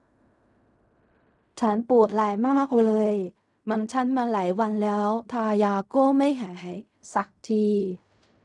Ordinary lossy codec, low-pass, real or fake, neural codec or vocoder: none; 10.8 kHz; fake; codec, 16 kHz in and 24 kHz out, 0.4 kbps, LongCat-Audio-Codec, fine tuned four codebook decoder